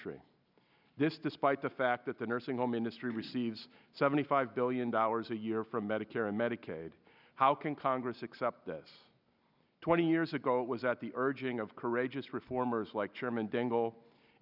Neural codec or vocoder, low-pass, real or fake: none; 5.4 kHz; real